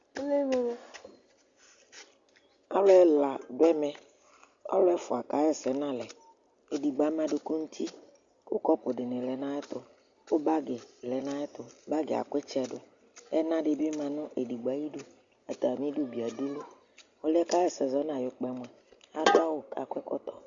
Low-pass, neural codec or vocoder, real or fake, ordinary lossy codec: 7.2 kHz; none; real; Opus, 64 kbps